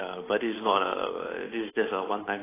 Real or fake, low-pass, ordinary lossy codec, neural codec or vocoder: fake; 3.6 kHz; AAC, 16 kbps; codec, 16 kHz, 8 kbps, FunCodec, trained on Chinese and English, 25 frames a second